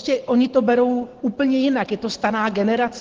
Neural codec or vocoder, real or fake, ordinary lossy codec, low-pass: none; real; Opus, 16 kbps; 7.2 kHz